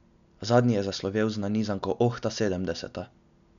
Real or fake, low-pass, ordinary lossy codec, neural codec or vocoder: real; 7.2 kHz; none; none